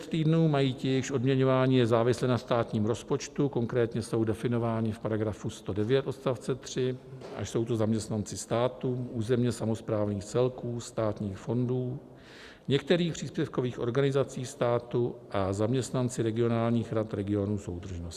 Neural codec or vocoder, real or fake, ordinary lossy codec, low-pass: none; real; Opus, 64 kbps; 14.4 kHz